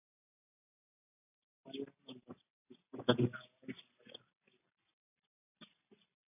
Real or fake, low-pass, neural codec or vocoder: real; 3.6 kHz; none